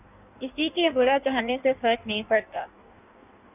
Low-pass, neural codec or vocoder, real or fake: 3.6 kHz; codec, 16 kHz in and 24 kHz out, 1.1 kbps, FireRedTTS-2 codec; fake